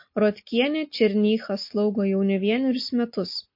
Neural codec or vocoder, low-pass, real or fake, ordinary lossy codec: none; 5.4 kHz; real; MP3, 32 kbps